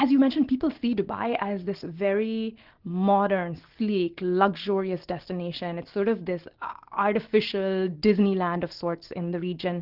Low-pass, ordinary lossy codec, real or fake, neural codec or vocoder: 5.4 kHz; Opus, 16 kbps; real; none